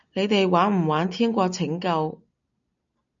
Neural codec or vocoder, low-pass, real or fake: none; 7.2 kHz; real